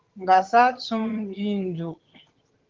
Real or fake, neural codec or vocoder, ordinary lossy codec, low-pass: fake; vocoder, 22.05 kHz, 80 mel bands, HiFi-GAN; Opus, 16 kbps; 7.2 kHz